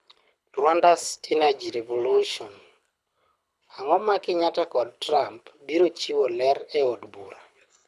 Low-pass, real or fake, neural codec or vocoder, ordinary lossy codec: none; fake; codec, 24 kHz, 6 kbps, HILCodec; none